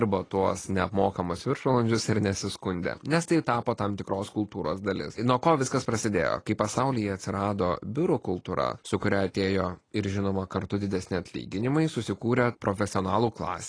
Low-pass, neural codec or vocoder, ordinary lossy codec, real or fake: 9.9 kHz; none; AAC, 32 kbps; real